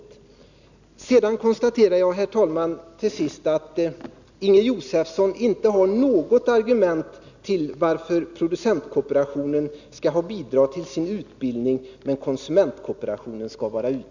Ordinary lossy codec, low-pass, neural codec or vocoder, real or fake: none; 7.2 kHz; none; real